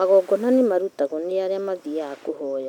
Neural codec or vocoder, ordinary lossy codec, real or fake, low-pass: none; none; real; 19.8 kHz